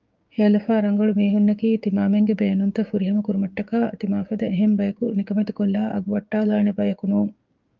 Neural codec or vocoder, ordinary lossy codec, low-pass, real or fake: codec, 16 kHz, 6 kbps, DAC; Opus, 24 kbps; 7.2 kHz; fake